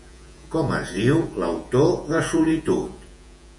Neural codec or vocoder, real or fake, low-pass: vocoder, 48 kHz, 128 mel bands, Vocos; fake; 10.8 kHz